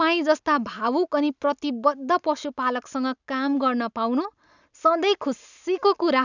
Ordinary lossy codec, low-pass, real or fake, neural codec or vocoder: none; 7.2 kHz; real; none